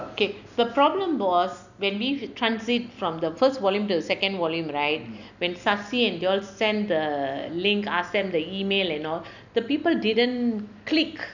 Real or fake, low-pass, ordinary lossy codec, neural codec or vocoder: real; 7.2 kHz; none; none